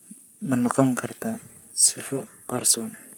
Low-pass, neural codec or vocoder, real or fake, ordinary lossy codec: none; codec, 44.1 kHz, 3.4 kbps, Pupu-Codec; fake; none